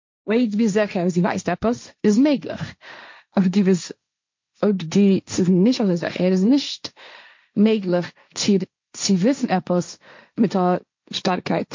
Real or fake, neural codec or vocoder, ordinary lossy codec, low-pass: fake; codec, 16 kHz, 1.1 kbps, Voila-Tokenizer; MP3, 48 kbps; 7.2 kHz